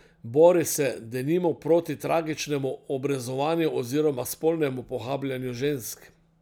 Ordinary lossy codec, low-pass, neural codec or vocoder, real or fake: none; none; none; real